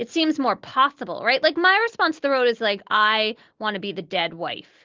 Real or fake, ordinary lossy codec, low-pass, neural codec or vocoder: real; Opus, 16 kbps; 7.2 kHz; none